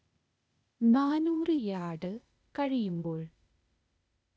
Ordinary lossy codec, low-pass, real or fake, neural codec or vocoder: none; none; fake; codec, 16 kHz, 0.8 kbps, ZipCodec